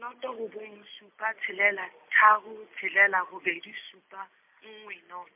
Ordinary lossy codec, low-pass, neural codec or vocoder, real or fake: MP3, 32 kbps; 3.6 kHz; none; real